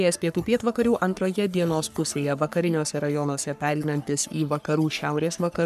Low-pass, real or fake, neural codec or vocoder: 14.4 kHz; fake; codec, 44.1 kHz, 3.4 kbps, Pupu-Codec